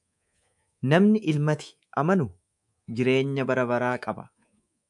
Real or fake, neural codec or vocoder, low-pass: fake; codec, 24 kHz, 3.1 kbps, DualCodec; 10.8 kHz